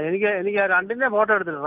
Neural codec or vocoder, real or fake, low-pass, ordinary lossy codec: none; real; 3.6 kHz; Opus, 32 kbps